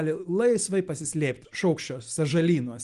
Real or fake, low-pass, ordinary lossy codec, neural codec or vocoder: real; 10.8 kHz; Opus, 24 kbps; none